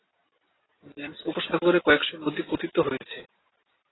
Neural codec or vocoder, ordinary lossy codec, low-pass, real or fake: none; AAC, 16 kbps; 7.2 kHz; real